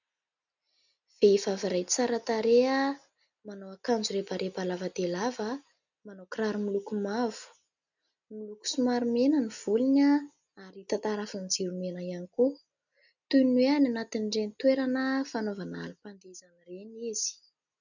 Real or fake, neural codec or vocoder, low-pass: real; none; 7.2 kHz